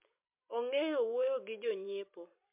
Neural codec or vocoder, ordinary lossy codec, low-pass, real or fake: vocoder, 44.1 kHz, 128 mel bands every 256 samples, BigVGAN v2; MP3, 32 kbps; 3.6 kHz; fake